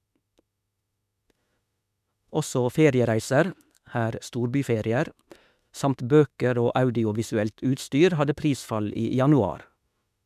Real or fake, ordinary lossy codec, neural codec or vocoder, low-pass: fake; none; autoencoder, 48 kHz, 32 numbers a frame, DAC-VAE, trained on Japanese speech; 14.4 kHz